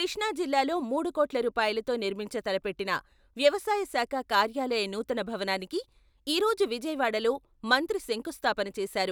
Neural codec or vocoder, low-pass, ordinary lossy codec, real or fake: none; none; none; real